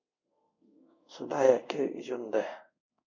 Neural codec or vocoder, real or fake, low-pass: codec, 24 kHz, 0.5 kbps, DualCodec; fake; 7.2 kHz